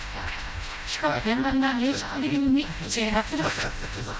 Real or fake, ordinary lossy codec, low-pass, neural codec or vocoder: fake; none; none; codec, 16 kHz, 0.5 kbps, FreqCodec, smaller model